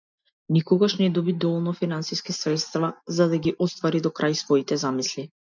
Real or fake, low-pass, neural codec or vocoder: real; 7.2 kHz; none